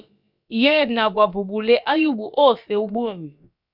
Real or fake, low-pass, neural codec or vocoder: fake; 5.4 kHz; codec, 16 kHz, about 1 kbps, DyCAST, with the encoder's durations